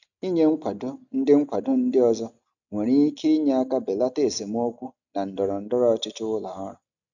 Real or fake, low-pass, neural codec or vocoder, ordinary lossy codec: real; 7.2 kHz; none; none